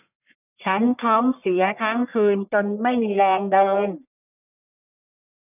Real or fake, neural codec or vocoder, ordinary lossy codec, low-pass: fake; codec, 44.1 kHz, 1.7 kbps, Pupu-Codec; none; 3.6 kHz